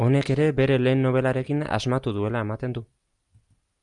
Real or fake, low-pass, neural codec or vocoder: real; 10.8 kHz; none